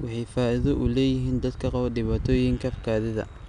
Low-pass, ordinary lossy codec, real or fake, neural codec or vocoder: 10.8 kHz; none; real; none